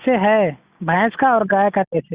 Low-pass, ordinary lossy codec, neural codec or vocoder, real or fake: 3.6 kHz; Opus, 64 kbps; none; real